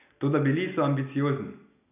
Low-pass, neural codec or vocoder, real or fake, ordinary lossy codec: 3.6 kHz; none; real; none